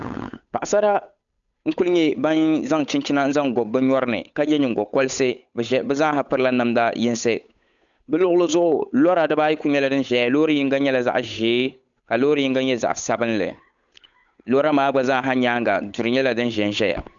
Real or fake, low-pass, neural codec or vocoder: fake; 7.2 kHz; codec, 16 kHz, 8 kbps, FunCodec, trained on Chinese and English, 25 frames a second